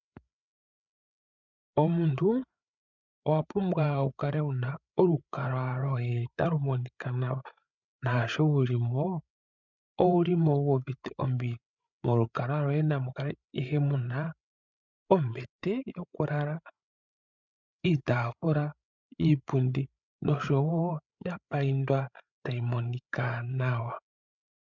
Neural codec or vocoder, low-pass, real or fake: codec, 16 kHz, 8 kbps, FreqCodec, larger model; 7.2 kHz; fake